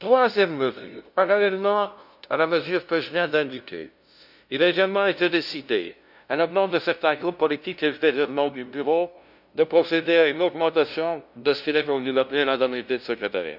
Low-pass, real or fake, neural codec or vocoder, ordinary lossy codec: 5.4 kHz; fake; codec, 16 kHz, 0.5 kbps, FunCodec, trained on LibriTTS, 25 frames a second; none